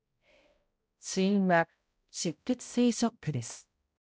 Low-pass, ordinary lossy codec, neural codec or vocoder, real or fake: none; none; codec, 16 kHz, 0.5 kbps, X-Codec, HuBERT features, trained on balanced general audio; fake